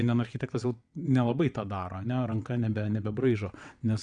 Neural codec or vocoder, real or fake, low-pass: vocoder, 22.05 kHz, 80 mel bands, WaveNeXt; fake; 9.9 kHz